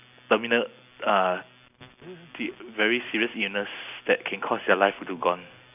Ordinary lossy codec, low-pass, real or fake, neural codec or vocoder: none; 3.6 kHz; real; none